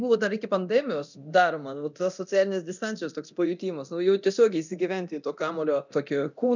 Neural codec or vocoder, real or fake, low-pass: codec, 24 kHz, 0.9 kbps, DualCodec; fake; 7.2 kHz